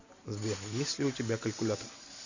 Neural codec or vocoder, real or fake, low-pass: none; real; 7.2 kHz